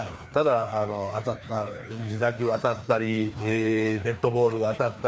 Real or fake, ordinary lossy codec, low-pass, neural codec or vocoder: fake; none; none; codec, 16 kHz, 2 kbps, FreqCodec, larger model